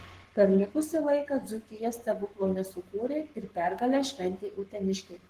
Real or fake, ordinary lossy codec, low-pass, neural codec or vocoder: fake; Opus, 16 kbps; 14.4 kHz; codec, 44.1 kHz, 7.8 kbps, Pupu-Codec